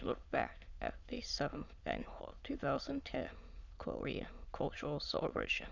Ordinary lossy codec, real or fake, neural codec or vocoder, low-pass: Opus, 64 kbps; fake; autoencoder, 22.05 kHz, a latent of 192 numbers a frame, VITS, trained on many speakers; 7.2 kHz